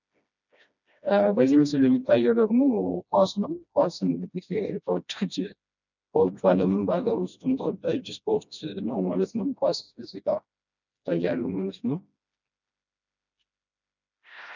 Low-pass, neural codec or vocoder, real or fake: 7.2 kHz; codec, 16 kHz, 1 kbps, FreqCodec, smaller model; fake